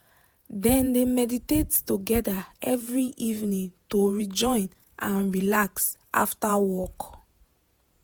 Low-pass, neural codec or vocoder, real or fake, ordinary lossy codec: none; vocoder, 48 kHz, 128 mel bands, Vocos; fake; none